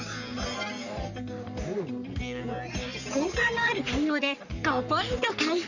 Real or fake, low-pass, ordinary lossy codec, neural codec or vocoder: fake; 7.2 kHz; none; codec, 44.1 kHz, 3.4 kbps, Pupu-Codec